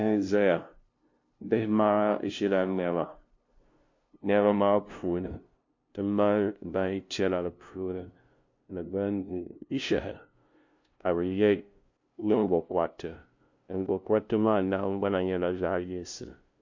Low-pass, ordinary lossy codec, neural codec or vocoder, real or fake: 7.2 kHz; MP3, 48 kbps; codec, 16 kHz, 0.5 kbps, FunCodec, trained on LibriTTS, 25 frames a second; fake